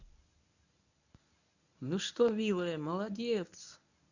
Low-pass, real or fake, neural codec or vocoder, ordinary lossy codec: 7.2 kHz; fake; codec, 24 kHz, 0.9 kbps, WavTokenizer, medium speech release version 1; none